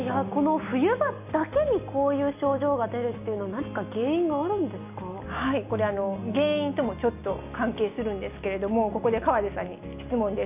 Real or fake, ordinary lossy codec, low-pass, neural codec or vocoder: real; none; 3.6 kHz; none